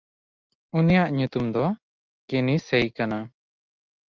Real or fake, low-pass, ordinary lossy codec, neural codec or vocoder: real; 7.2 kHz; Opus, 24 kbps; none